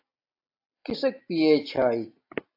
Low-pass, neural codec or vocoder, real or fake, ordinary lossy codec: 5.4 kHz; none; real; AAC, 32 kbps